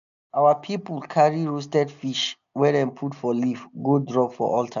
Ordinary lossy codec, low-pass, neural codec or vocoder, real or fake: none; 7.2 kHz; none; real